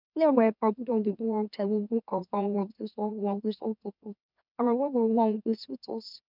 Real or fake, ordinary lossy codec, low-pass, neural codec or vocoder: fake; none; 5.4 kHz; autoencoder, 44.1 kHz, a latent of 192 numbers a frame, MeloTTS